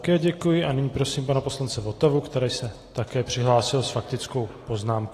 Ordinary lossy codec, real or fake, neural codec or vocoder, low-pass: AAC, 48 kbps; real; none; 14.4 kHz